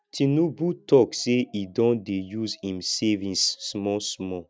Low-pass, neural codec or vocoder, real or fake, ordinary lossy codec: none; none; real; none